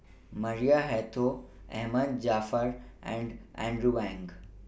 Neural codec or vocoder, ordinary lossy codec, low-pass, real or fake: none; none; none; real